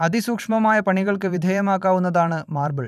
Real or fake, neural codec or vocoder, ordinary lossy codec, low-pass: fake; vocoder, 44.1 kHz, 128 mel bands every 512 samples, BigVGAN v2; none; 14.4 kHz